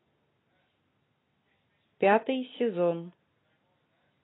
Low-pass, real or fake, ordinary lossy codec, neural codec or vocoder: 7.2 kHz; real; AAC, 16 kbps; none